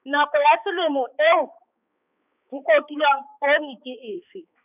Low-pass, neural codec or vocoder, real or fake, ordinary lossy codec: 3.6 kHz; codec, 16 kHz, 4 kbps, X-Codec, HuBERT features, trained on balanced general audio; fake; none